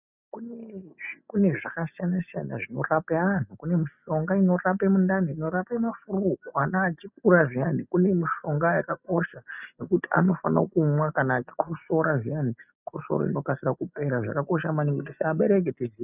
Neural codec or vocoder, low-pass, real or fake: none; 3.6 kHz; real